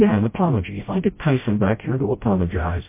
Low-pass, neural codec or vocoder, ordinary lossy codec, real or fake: 3.6 kHz; codec, 16 kHz, 0.5 kbps, FreqCodec, smaller model; MP3, 24 kbps; fake